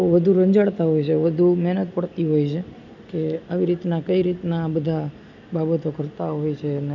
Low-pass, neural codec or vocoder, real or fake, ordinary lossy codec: 7.2 kHz; none; real; none